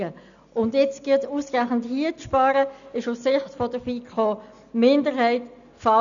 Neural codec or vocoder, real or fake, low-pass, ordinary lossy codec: none; real; 7.2 kHz; none